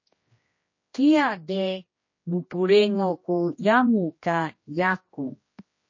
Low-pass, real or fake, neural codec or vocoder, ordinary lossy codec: 7.2 kHz; fake; codec, 16 kHz, 1 kbps, X-Codec, HuBERT features, trained on general audio; MP3, 32 kbps